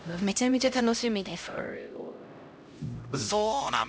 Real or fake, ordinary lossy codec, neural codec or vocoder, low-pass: fake; none; codec, 16 kHz, 0.5 kbps, X-Codec, HuBERT features, trained on LibriSpeech; none